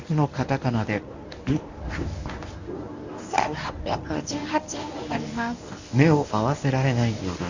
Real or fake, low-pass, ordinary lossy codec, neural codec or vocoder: fake; 7.2 kHz; Opus, 64 kbps; codec, 24 kHz, 0.9 kbps, WavTokenizer, medium speech release version 1